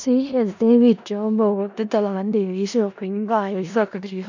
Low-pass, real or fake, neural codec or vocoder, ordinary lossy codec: 7.2 kHz; fake; codec, 16 kHz in and 24 kHz out, 0.4 kbps, LongCat-Audio-Codec, four codebook decoder; none